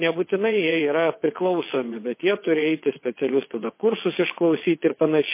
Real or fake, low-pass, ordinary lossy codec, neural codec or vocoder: fake; 3.6 kHz; MP3, 24 kbps; vocoder, 22.05 kHz, 80 mel bands, WaveNeXt